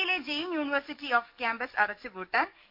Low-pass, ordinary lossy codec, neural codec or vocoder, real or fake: 5.4 kHz; MP3, 48 kbps; codec, 44.1 kHz, 7.8 kbps, DAC; fake